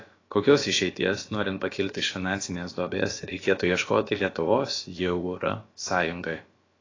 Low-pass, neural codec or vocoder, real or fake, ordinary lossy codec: 7.2 kHz; codec, 16 kHz, about 1 kbps, DyCAST, with the encoder's durations; fake; AAC, 32 kbps